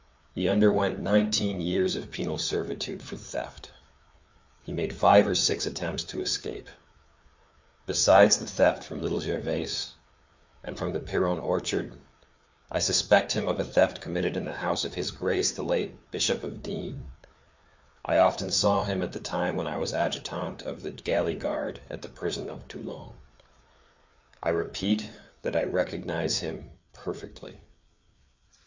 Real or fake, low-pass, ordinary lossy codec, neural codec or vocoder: fake; 7.2 kHz; MP3, 64 kbps; codec, 16 kHz, 4 kbps, FreqCodec, larger model